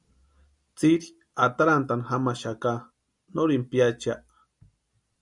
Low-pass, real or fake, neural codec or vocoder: 10.8 kHz; real; none